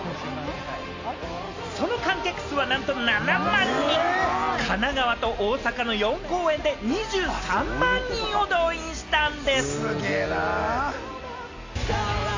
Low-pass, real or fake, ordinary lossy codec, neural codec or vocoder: 7.2 kHz; real; AAC, 48 kbps; none